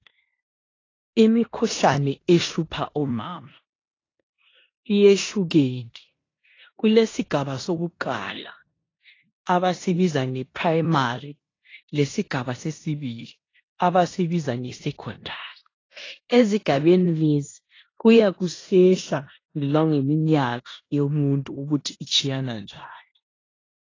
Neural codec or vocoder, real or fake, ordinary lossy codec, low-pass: codec, 16 kHz in and 24 kHz out, 0.9 kbps, LongCat-Audio-Codec, four codebook decoder; fake; AAC, 32 kbps; 7.2 kHz